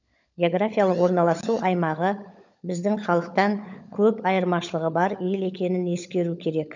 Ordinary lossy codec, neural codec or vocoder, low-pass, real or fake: none; codec, 16 kHz, 16 kbps, FunCodec, trained on LibriTTS, 50 frames a second; 7.2 kHz; fake